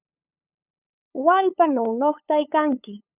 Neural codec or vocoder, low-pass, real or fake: codec, 16 kHz, 8 kbps, FunCodec, trained on LibriTTS, 25 frames a second; 3.6 kHz; fake